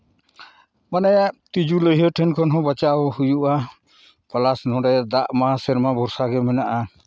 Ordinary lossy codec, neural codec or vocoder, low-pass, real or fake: none; none; none; real